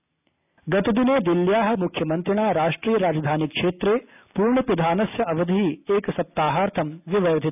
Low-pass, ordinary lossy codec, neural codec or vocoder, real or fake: 3.6 kHz; none; none; real